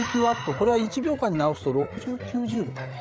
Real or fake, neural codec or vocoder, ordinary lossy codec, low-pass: fake; codec, 16 kHz, 8 kbps, FreqCodec, larger model; none; none